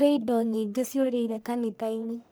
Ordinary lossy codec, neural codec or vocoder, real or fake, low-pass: none; codec, 44.1 kHz, 1.7 kbps, Pupu-Codec; fake; none